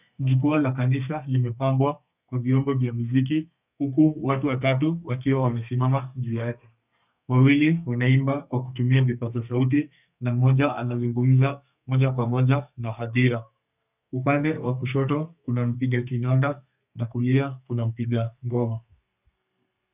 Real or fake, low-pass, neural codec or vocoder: fake; 3.6 kHz; codec, 32 kHz, 1.9 kbps, SNAC